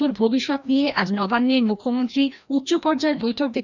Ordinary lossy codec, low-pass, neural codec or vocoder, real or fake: none; 7.2 kHz; codec, 16 kHz, 1 kbps, FreqCodec, larger model; fake